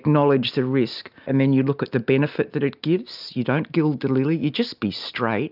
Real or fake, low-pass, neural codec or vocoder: real; 5.4 kHz; none